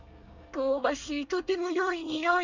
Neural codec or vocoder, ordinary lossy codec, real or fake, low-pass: codec, 24 kHz, 1 kbps, SNAC; none; fake; 7.2 kHz